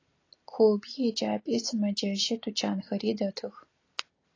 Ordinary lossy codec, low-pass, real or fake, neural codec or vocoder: AAC, 32 kbps; 7.2 kHz; real; none